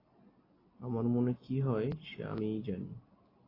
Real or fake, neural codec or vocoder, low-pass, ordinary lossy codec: real; none; 5.4 kHz; AAC, 24 kbps